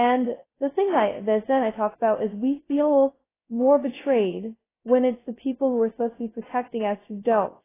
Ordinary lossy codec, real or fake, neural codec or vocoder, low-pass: AAC, 16 kbps; fake; codec, 16 kHz, 0.2 kbps, FocalCodec; 3.6 kHz